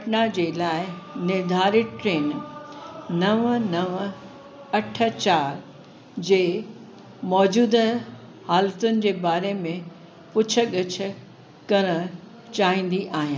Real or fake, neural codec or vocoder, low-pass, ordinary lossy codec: real; none; none; none